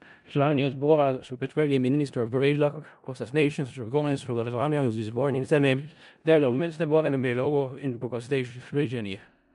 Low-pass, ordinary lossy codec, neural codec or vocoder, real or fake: 10.8 kHz; MP3, 64 kbps; codec, 16 kHz in and 24 kHz out, 0.4 kbps, LongCat-Audio-Codec, four codebook decoder; fake